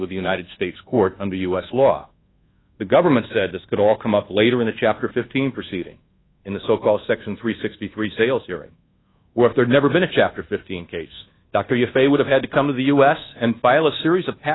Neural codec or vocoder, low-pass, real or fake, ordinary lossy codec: codec, 24 kHz, 1.2 kbps, DualCodec; 7.2 kHz; fake; AAC, 16 kbps